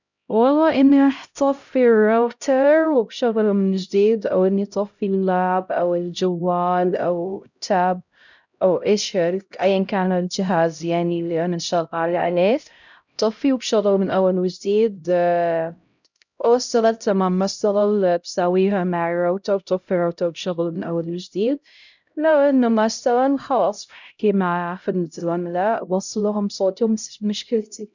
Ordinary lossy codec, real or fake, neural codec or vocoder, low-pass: none; fake; codec, 16 kHz, 0.5 kbps, X-Codec, HuBERT features, trained on LibriSpeech; 7.2 kHz